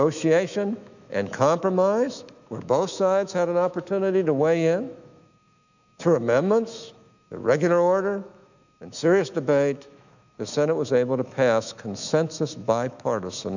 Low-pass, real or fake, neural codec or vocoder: 7.2 kHz; fake; autoencoder, 48 kHz, 128 numbers a frame, DAC-VAE, trained on Japanese speech